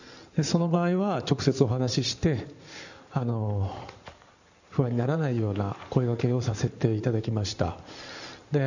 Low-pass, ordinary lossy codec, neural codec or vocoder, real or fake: 7.2 kHz; none; codec, 16 kHz in and 24 kHz out, 2.2 kbps, FireRedTTS-2 codec; fake